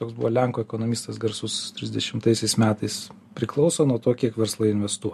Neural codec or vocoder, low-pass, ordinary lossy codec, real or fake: none; 14.4 kHz; MP3, 64 kbps; real